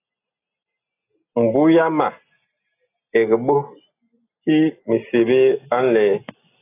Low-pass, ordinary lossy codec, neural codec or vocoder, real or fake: 3.6 kHz; AAC, 24 kbps; none; real